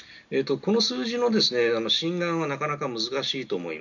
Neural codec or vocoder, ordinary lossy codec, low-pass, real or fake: none; none; 7.2 kHz; real